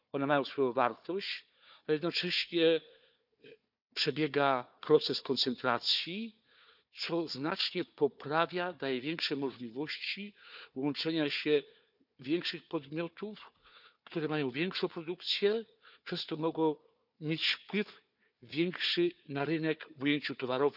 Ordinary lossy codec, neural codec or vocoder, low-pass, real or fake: none; codec, 16 kHz, 4 kbps, FunCodec, trained on Chinese and English, 50 frames a second; 5.4 kHz; fake